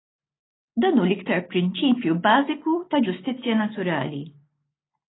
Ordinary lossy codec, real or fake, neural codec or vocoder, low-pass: AAC, 16 kbps; real; none; 7.2 kHz